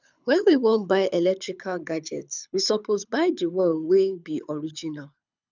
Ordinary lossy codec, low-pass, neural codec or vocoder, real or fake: none; 7.2 kHz; codec, 24 kHz, 6 kbps, HILCodec; fake